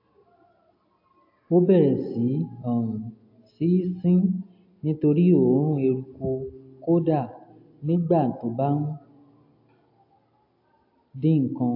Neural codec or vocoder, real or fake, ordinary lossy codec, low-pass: none; real; AAC, 48 kbps; 5.4 kHz